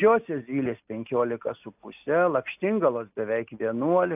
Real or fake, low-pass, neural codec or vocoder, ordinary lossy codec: real; 3.6 kHz; none; AAC, 32 kbps